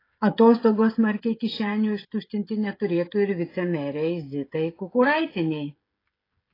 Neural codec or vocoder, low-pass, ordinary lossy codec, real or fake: codec, 16 kHz, 16 kbps, FreqCodec, smaller model; 5.4 kHz; AAC, 24 kbps; fake